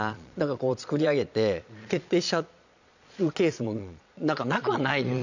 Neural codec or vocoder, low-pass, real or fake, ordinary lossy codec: vocoder, 22.05 kHz, 80 mel bands, Vocos; 7.2 kHz; fake; none